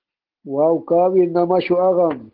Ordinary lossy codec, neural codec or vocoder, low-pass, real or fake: Opus, 16 kbps; none; 5.4 kHz; real